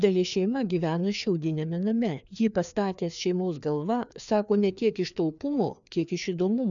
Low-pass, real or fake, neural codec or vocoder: 7.2 kHz; fake; codec, 16 kHz, 2 kbps, FreqCodec, larger model